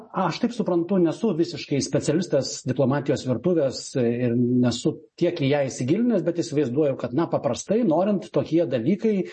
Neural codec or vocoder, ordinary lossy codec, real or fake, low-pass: none; MP3, 32 kbps; real; 10.8 kHz